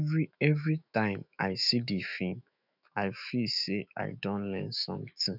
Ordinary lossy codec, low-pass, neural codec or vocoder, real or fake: none; 5.4 kHz; autoencoder, 48 kHz, 128 numbers a frame, DAC-VAE, trained on Japanese speech; fake